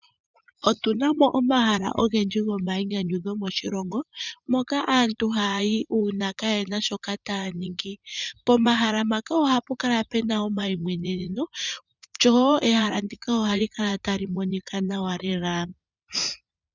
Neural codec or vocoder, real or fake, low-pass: vocoder, 44.1 kHz, 80 mel bands, Vocos; fake; 7.2 kHz